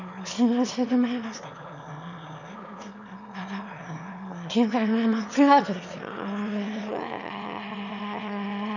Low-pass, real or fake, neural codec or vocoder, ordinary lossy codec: 7.2 kHz; fake; autoencoder, 22.05 kHz, a latent of 192 numbers a frame, VITS, trained on one speaker; none